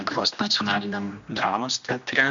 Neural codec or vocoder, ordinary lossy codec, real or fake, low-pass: codec, 16 kHz, 1 kbps, X-Codec, HuBERT features, trained on general audio; MP3, 64 kbps; fake; 7.2 kHz